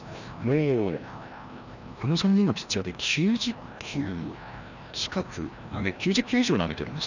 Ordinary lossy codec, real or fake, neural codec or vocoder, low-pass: none; fake; codec, 16 kHz, 1 kbps, FreqCodec, larger model; 7.2 kHz